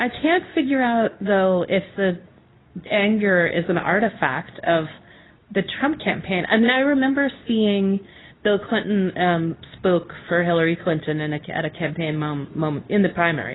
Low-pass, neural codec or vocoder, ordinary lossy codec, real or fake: 7.2 kHz; codec, 24 kHz, 0.9 kbps, WavTokenizer, medium speech release version 2; AAC, 16 kbps; fake